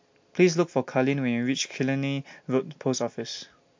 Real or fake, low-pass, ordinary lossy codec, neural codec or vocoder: real; 7.2 kHz; MP3, 48 kbps; none